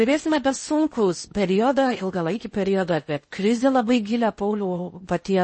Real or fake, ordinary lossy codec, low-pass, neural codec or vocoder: fake; MP3, 32 kbps; 9.9 kHz; codec, 16 kHz in and 24 kHz out, 0.6 kbps, FocalCodec, streaming, 4096 codes